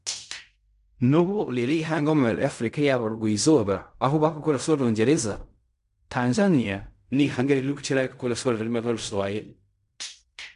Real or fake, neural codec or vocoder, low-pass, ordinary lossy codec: fake; codec, 16 kHz in and 24 kHz out, 0.4 kbps, LongCat-Audio-Codec, fine tuned four codebook decoder; 10.8 kHz; none